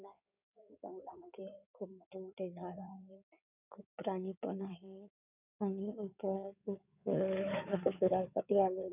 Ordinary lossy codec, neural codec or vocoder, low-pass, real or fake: none; codec, 16 kHz in and 24 kHz out, 2.2 kbps, FireRedTTS-2 codec; 3.6 kHz; fake